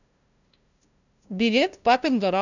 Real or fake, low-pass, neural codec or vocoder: fake; 7.2 kHz; codec, 16 kHz, 0.5 kbps, FunCodec, trained on LibriTTS, 25 frames a second